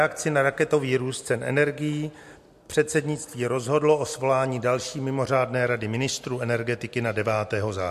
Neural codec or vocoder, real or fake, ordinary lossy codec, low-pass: none; real; MP3, 64 kbps; 14.4 kHz